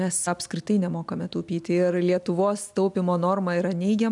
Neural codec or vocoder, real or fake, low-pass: none; real; 10.8 kHz